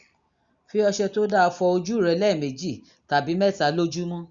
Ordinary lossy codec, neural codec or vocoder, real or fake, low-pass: Opus, 64 kbps; none; real; 7.2 kHz